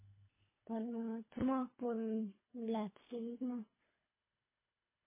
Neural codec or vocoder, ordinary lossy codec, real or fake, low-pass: codec, 24 kHz, 3 kbps, HILCodec; MP3, 16 kbps; fake; 3.6 kHz